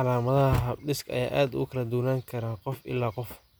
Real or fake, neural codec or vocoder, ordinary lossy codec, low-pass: real; none; none; none